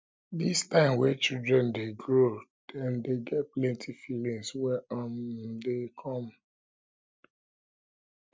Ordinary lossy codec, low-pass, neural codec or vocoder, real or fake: none; none; none; real